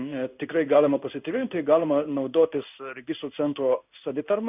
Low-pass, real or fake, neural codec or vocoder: 3.6 kHz; fake; codec, 16 kHz in and 24 kHz out, 1 kbps, XY-Tokenizer